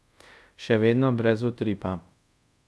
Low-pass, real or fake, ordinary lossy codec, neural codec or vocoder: none; fake; none; codec, 24 kHz, 0.5 kbps, DualCodec